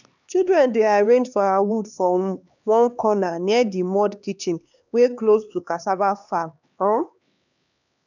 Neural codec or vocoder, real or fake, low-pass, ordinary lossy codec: codec, 16 kHz, 2 kbps, X-Codec, HuBERT features, trained on LibriSpeech; fake; 7.2 kHz; none